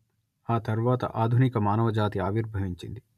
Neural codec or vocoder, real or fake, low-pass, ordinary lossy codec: none; real; 14.4 kHz; none